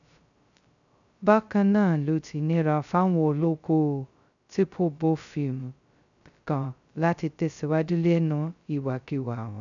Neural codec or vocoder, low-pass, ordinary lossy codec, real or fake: codec, 16 kHz, 0.2 kbps, FocalCodec; 7.2 kHz; none; fake